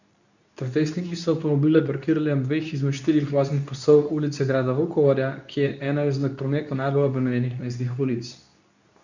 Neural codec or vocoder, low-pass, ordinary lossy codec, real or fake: codec, 24 kHz, 0.9 kbps, WavTokenizer, medium speech release version 2; 7.2 kHz; none; fake